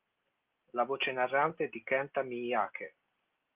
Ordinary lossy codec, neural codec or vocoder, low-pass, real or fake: Opus, 32 kbps; none; 3.6 kHz; real